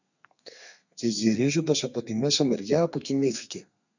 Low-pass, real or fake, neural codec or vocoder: 7.2 kHz; fake; codec, 32 kHz, 1.9 kbps, SNAC